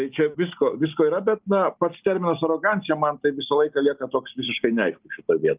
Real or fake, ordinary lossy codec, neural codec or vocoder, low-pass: real; Opus, 32 kbps; none; 3.6 kHz